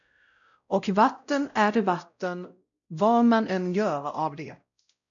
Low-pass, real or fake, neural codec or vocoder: 7.2 kHz; fake; codec, 16 kHz, 0.5 kbps, X-Codec, WavLM features, trained on Multilingual LibriSpeech